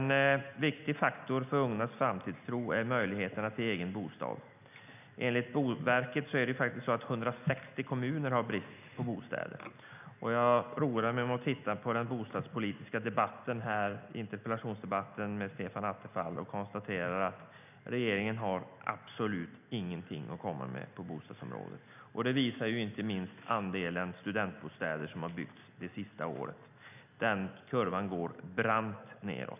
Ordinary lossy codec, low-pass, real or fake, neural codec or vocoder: none; 3.6 kHz; real; none